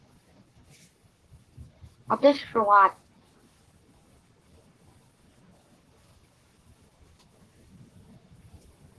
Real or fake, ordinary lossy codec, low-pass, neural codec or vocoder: fake; Opus, 16 kbps; 10.8 kHz; codec, 44.1 kHz, 7.8 kbps, Pupu-Codec